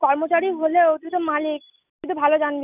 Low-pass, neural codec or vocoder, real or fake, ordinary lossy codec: 3.6 kHz; none; real; none